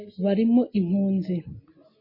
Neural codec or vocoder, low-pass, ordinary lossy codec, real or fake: none; 5.4 kHz; MP3, 24 kbps; real